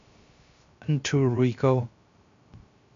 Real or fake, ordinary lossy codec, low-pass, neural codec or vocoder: fake; MP3, 64 kbps; 7.2 kHz; codec, 16 kHz, 0.3 kbps, FocalCodec